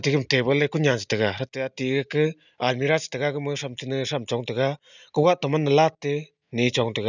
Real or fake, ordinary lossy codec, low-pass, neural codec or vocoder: real; none; 7.2 kHz; none